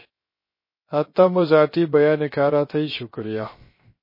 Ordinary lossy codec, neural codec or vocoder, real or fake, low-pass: MP3, 24 kbps; codec, 16 kHz, 0.3 kbps, FocalCodec; fake; 5.4 kHz